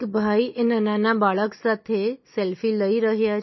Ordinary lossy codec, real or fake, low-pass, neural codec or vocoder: MP3, 24 kbps; real; 7.2 kHz; none